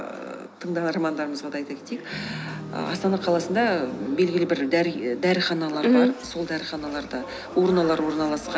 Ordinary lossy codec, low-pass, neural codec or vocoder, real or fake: none; none; none; real